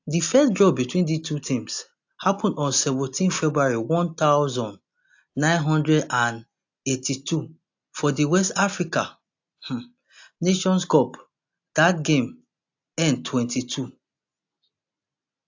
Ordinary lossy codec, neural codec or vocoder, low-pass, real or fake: AAC, 48 kbps; none; 7.2 kHz; real